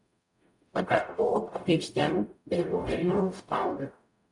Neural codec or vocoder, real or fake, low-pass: codec, 44.1 kHz, 0.9 kbps, DAC; fake; 10.8 kHz